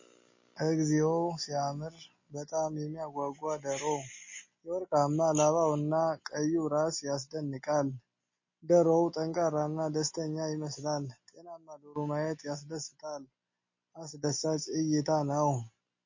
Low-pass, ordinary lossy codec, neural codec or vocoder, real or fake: 7.2 kHz; MP3, 32 kbps; none; real